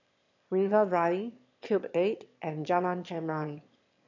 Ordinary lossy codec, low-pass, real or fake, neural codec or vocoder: none; 7.2 kHz; fake; autoencoder, 22.05 kHz, a latent of 192 numbers a frame, VITS, trained on one speaker